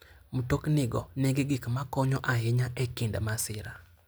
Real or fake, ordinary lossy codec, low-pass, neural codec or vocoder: real; none; none; none